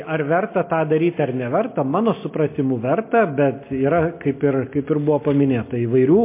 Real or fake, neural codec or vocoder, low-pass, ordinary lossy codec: real; none; 3.6 kHz; MP3, 24 kbps